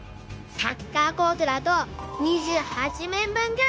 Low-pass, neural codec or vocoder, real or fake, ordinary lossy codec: none; codec, 16 kHz, 0.9 kbps, LongCat-Audio-Codec; fake; none